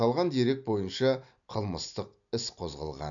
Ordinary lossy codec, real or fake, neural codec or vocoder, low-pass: none; real; none; 7.2 kHz